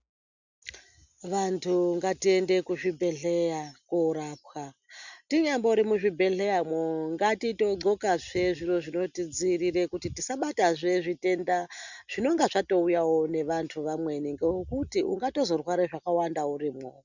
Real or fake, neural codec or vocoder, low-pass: real; none; 7.2 kHz